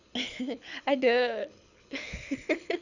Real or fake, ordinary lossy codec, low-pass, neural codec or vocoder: fake; none; 7.2 kHz; codec, 24 kHz, 6 kbps, HILCodec